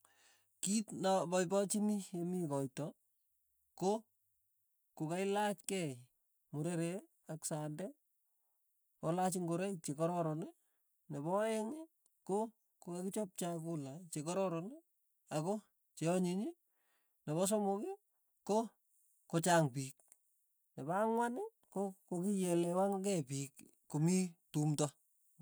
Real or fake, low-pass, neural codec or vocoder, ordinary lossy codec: real; none; none; none